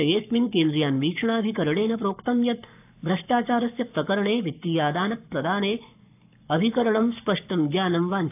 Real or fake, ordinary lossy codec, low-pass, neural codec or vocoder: fake; AAC, 32 kbps; 3.6 kHz; codec, 44.1 kHz, 7.8 kbps, Pupu-Codec